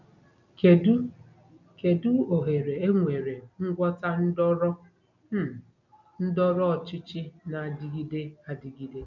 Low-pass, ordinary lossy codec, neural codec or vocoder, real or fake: 7.2 kHz; none; none; real